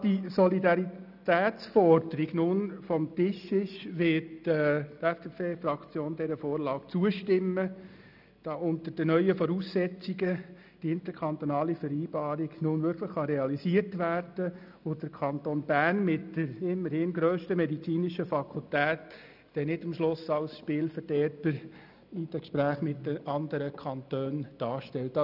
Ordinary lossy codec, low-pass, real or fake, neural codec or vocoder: none; 5.4 kHz; real; none